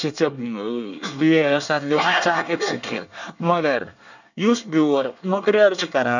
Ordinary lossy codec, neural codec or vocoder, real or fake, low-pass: none; codec, 24 kHz, 1 kbps, SNAC; fake; 7.2 kHz